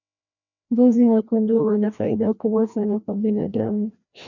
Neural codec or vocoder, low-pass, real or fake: codec, 16 kHz, 1 kbps, FreqCodec, larger model; 7.2 kHz; fake